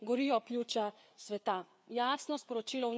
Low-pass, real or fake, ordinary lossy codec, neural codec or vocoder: none; fake; none; codec, 16 kHz, 4 kbps, FreqCodec, larger model